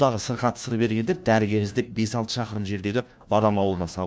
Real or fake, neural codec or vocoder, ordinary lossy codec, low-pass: fake; codec, 16 kHz, 1 kbps, FunCodec, trained on LibriTTS, 50 frames a second; none; none